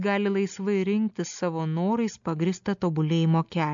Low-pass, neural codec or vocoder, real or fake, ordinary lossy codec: 7.2 kHz; none; real; MP3, 64 kbps